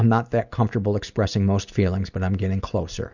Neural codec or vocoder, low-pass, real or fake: none; 7.2 kHz; real